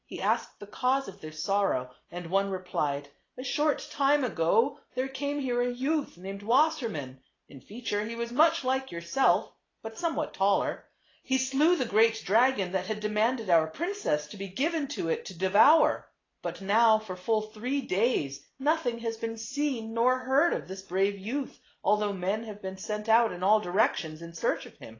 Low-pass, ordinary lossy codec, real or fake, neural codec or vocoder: 7.2 kHz; AAC, 32 kbps; real; none